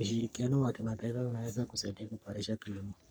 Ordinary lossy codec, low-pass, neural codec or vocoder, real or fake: none; none; codec, 44.1 kHz, 3.4 kbps, Pupu-Codec; fake